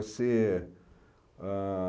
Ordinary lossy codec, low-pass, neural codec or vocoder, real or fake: none; none; none; real